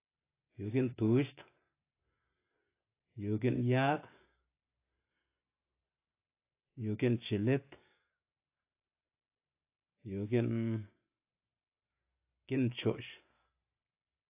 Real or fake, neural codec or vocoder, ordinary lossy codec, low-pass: real; none; AAC, 24 kbps; 3.6 kHz